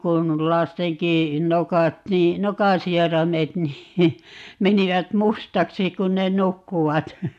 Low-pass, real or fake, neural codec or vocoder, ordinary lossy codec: 14.4 kHz; real; none; AAC, 96 kbps